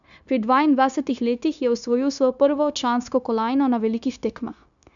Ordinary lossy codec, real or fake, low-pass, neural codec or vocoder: none; fake; 7.2 kHz; codec, 16 kHz, 0.9 kbps, LongCat-Audio-Codec